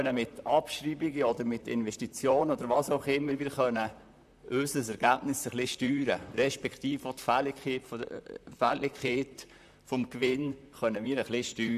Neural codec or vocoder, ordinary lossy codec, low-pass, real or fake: vocoder, 44.1 kHz, 128 mel bands, Pupu-Vocoder; none; 14.4 kHz; fake